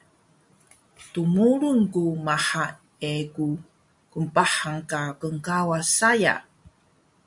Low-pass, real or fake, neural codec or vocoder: 10.8 kHz; real; none